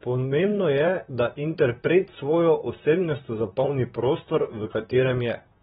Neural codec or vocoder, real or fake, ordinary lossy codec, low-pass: vocoder, 44.1 kHz, 128 mel bands, Pupu-Vocoder; fake; AAC, 16 kbps; 19.8 kHz